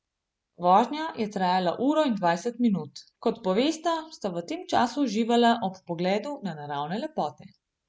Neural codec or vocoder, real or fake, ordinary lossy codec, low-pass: none; real; none; none